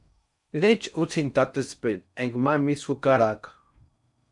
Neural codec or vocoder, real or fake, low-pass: codec, 16 kHz in and 24 kHz out, 0.6 kbps, FocalCodec, streaming, 4096 codes; fake; 10.8 kHz